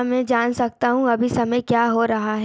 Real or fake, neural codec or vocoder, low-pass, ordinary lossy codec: real; none; none; none